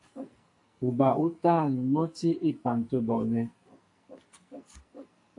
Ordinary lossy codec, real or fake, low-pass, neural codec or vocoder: AAC, 48 kbps; fake; 10.8 kHz; codec, 44.1 kHz, 2.6 kbps, SNAC